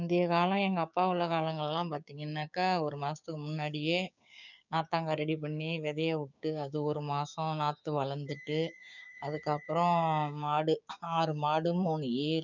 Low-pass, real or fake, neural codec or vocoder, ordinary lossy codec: 7.2 kHz; fake; codec, 16 kHz, 6 kbps, DAC; none